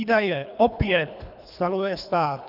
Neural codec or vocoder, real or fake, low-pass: codec, 24 kHz, 3 kbps, HILCodec; fake; 5.4 kHz